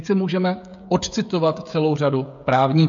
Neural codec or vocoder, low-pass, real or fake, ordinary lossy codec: codec, 16 kHz, 16 kbps, FreqCodec, smaller model; 7.2 kHz; fake; AAC, 64 kbps